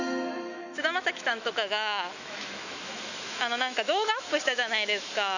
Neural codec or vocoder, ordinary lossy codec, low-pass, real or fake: autoencoder, 48 kHz, 128 numbers a frame, DAC-VAE, trained on Japanese speech; none; 7.2 kHz; fake